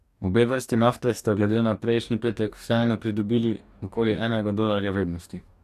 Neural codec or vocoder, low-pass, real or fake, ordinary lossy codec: codec, 44.1 kHz, 2.6 kbps, DAC; 14.4 kHz; fake; none